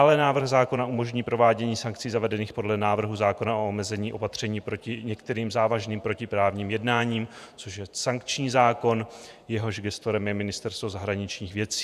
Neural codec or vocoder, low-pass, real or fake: vocoder, 48 kHz, 128 mel bands, Vocos; 14.4 kHz; fake